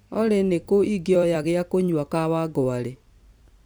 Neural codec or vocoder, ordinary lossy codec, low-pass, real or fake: vocoder, 44.1 kHz, 128 mel bands every 256 samples, BigVGAN v2; none; none; fake